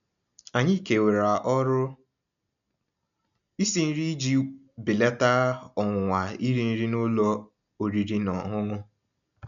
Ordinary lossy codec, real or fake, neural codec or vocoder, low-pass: none; real; none; 7.2 kHz